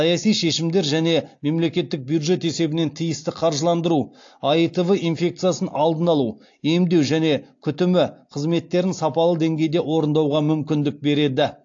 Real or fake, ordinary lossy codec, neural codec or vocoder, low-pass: real; AAC, 48 kbps; none; 7.2 kHz